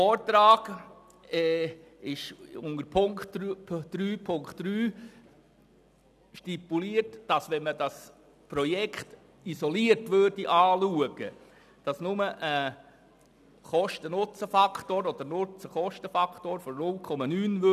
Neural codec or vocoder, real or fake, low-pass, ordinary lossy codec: none; real; 14.4 kHz; none